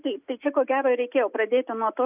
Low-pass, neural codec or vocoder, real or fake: 3.6 kHz; none; real